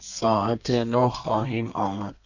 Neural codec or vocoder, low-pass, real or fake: codec, 32 kHz, 1.9 kbps, SNAC; 7.2 kHz; fake